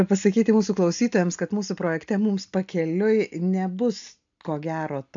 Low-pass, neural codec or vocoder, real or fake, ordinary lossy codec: 7.2 kHz; none; real; AAC, 64 kbps